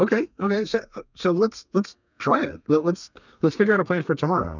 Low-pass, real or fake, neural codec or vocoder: 7.2 kHz; fake; codec, 32 kHz, 1.9 kbps, SNAC